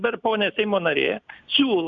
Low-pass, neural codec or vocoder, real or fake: 7.2 kHz; none; real